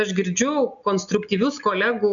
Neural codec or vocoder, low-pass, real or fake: none; 7.2 kHz; real